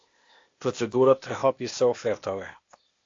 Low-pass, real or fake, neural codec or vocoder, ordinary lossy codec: 7.2 kHz; fake; codec, 16 kHz, 0.8 kbps, ZipCodec; AAC, 48 kbps